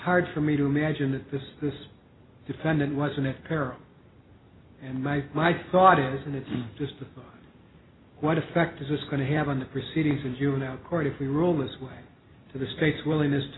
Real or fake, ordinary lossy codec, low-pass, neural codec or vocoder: real; AAC, 16 kbps; 7.2 kHz; none